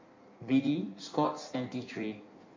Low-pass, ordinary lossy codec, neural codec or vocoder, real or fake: 7.2 kHz; MP3, 48 kbps; codec, 16 kHz in and 24 kHz out, 1.1 kbps, FireRedTTS-2 codec; fake